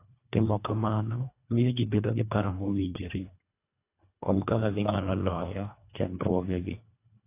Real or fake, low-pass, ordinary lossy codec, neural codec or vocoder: fake; 3.6 kHz; AAC, 24 kbps; codec, 24 kHz, 1.5 kbps, HILCodec